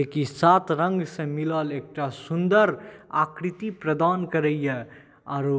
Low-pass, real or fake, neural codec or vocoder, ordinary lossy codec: none; real; none; none